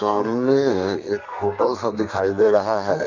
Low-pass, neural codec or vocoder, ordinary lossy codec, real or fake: 7.2 kHz; codec, 32 kHz, 1.9 kbps, SNAC; none; fake